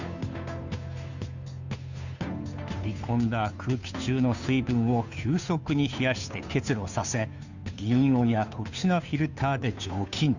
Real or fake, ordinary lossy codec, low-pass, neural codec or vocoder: fake; none; 7.2 kHz; codec, 16 kHz, 2 kbps, FunCodec, trained on Chinese and English, 25 frames a second